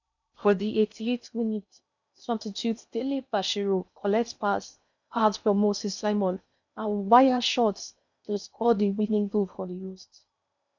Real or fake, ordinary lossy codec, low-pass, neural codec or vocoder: fake; none; 7.2 kHz; codec, 16 kHz in and 24 kHz out, 0.6 kbps, FocalCodec, streaming, 2048 codes